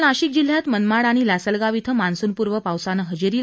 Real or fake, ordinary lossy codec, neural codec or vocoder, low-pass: real; none; none; none